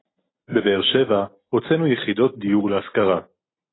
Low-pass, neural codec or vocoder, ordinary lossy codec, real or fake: 7.2 kHz; none; AAC, 16 kbps; real